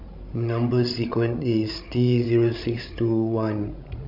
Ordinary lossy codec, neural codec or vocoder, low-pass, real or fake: none; codec, 16 kHz, 16 kbps, FreqCodec, larger model; 5.4 kHz; fake